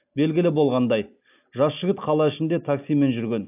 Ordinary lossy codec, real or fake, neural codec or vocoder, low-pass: AAC, 32 kbps; real; none; 3.6 kHz